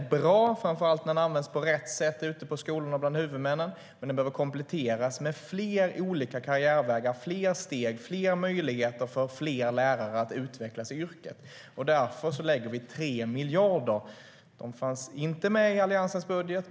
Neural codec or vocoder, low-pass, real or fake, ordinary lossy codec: none; none; real; none